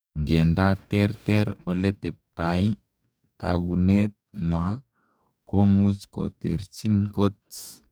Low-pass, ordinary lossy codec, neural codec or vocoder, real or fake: none; none; codec, 44.1 kHz, 2.6 kbps, DAC; fake